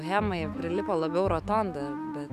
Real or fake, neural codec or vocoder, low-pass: fake; autoencoder, 48 kHz, 128 numbers a frame, DAC-VAE, trained on Japanese speech; 14.4 kHz